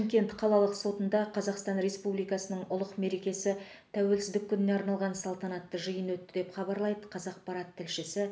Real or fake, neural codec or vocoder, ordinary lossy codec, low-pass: real; none; none; none